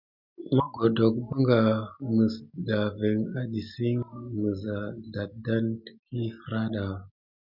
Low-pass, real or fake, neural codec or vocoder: 5.4 kHz; real; none